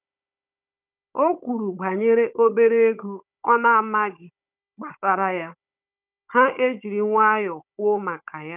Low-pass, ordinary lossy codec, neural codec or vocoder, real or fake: 3.6 kHz; none; codec, 16 kHz, 16 kbps, FunCodec, trained on Chinese and English, 50 frames a second; fake